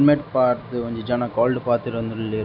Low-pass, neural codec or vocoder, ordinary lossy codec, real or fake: 5.4 kHz; none; none; real